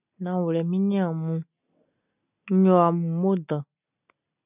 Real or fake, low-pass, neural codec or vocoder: real; 3.6 kHz; none